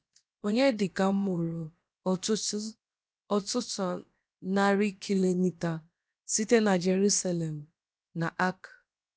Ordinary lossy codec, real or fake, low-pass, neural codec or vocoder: none; fake; none; codec, 16 kHz, about 1 kbps, DyCAST, with the encoder's durations